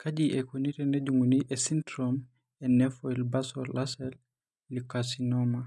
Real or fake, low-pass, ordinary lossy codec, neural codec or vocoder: real; none; none; none